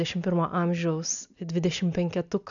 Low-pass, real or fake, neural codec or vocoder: 7.2 kHz; real; none